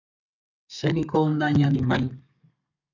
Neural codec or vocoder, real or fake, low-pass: codec, 32 kHz, 1.9 kbps, SNAC; fake; 7.2 kHz